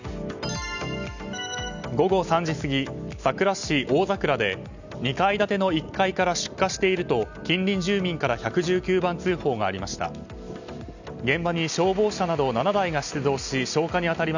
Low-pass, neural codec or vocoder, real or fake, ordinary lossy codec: 7.2 kHz; none; real; none